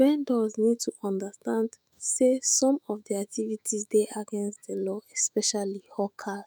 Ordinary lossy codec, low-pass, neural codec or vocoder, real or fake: none; none; autoencoder, 48 kHz, 128 numbers a frame, DAC-VAE, trained on Japanese speech; fake